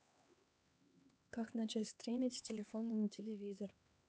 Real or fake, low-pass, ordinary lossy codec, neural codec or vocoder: fake; none; none; codec, 16 kHz, 2 kbps, X-Codec, HuBERT features, trained on LibriSpeech